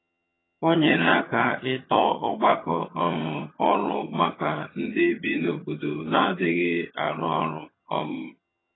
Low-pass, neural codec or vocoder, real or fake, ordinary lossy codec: 7.2 kHz; vocoder, 22.05 kHz, 80 mel bands, HiFi-GAN; fake; AAC, 16 kbps